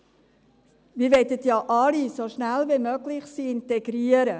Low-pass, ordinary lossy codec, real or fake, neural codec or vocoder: none; none; real; none